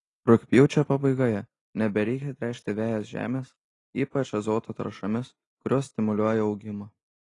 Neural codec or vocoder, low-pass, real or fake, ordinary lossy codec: none; 10.8 kHz; real; AAC, 32 kbps